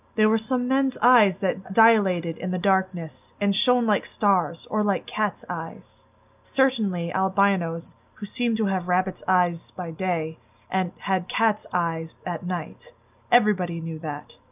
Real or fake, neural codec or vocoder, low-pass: real; none; 3.6 kHz